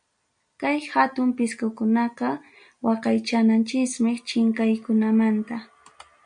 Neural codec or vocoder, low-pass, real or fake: none; 9.9 kHz; real